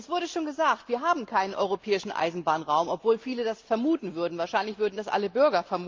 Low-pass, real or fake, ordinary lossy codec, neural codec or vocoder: 7.2 kHz; real; Opus, 24 kbps; none